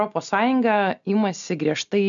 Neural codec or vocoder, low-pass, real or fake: none; 7.2 kHz; real